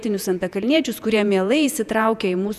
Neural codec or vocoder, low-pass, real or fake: vocoder, 48 kHz, 128 mel bands, Vocos; 14.4 kHz; fake